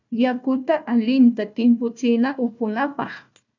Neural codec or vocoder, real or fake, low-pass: codec, 16 kHz, 1 kbps, FunCodec, trained on Chinese and English, 50 frames a second; fake; 7.2 kHz